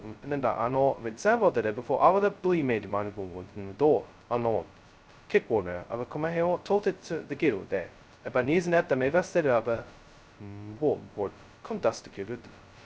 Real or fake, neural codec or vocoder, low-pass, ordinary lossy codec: fake; codec, 16 kHz, 0.2 kbps, FocalCodec; none; none